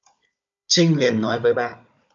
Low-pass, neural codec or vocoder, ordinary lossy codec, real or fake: 7.2 kHz; codec, 16 kHz, 16 kbps, FunCodec, trained on Chinese and English, 50 frames a second; MP3, 64 kbps; fake